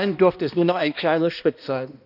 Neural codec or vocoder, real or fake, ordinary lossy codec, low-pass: codec, 16 kHz, 1 kbps, X-Codec, HuBERT features, trained on balanced general audio; fake; none; 5.4 kHz